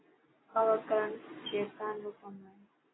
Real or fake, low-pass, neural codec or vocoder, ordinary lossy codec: real; 7.2 kHz; none; AAC, 16 kbps